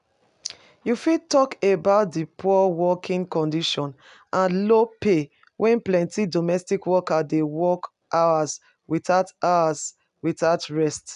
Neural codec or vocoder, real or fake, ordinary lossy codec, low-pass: none; real; none; 10.8 kHz